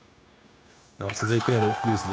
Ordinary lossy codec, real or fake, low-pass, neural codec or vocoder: none; fake; none; codec, 16 kHz, 0.9 kbps, LongCat-Audio-Codec